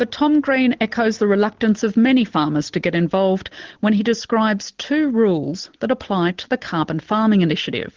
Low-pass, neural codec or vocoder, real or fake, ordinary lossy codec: 7.2 kHz; none; real; Opus, 16 kbps